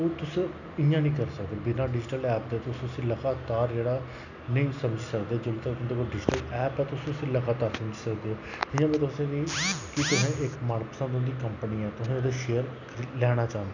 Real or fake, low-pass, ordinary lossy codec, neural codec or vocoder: real; 7.2 kHz; none; none